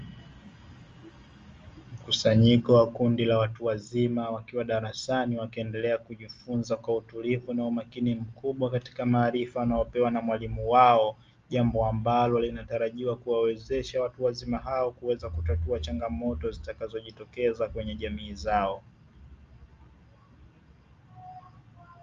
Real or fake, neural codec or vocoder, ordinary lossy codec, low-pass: real; none; Opus, 32 kbps; 7.2 kHz